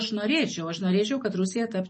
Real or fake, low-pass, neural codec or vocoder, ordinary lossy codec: real; 10.8 kHz; none; MP3, 32 kbps